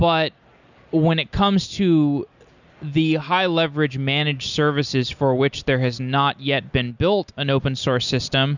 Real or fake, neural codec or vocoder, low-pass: real; none; 7.2 kHz